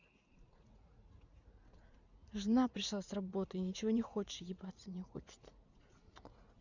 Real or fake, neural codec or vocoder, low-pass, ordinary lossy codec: fake; vocoder, 44.1 kHz, 80 mel bands, Vocos; 7.2 kHz; none